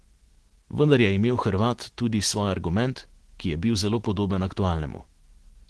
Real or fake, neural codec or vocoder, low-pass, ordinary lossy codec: fake; autoencoder, 48 kHz, 128 numbers a frame, DAC-VAE, trained on Japanese speech; 10.8 kHz; Opus, 16 kbps